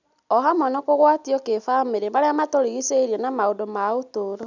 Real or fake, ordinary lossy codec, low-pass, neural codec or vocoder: real; none; 7.2 kHz; none